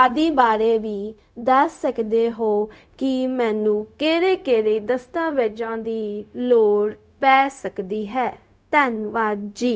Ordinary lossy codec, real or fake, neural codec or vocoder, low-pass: none; fake; codec, 16 kHz, 0.4 kbps, LongCat-Audio-Codec; none